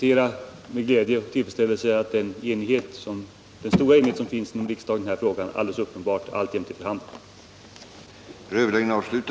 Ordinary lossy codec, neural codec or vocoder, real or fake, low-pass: none; none; real; none